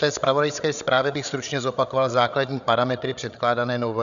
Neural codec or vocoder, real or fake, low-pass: codec, 16 kHz, 8 kbps, FreqCodec, larger model; fake; 7.2 kHz